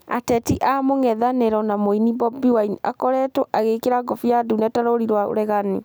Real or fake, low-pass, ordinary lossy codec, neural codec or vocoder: real; none; none; none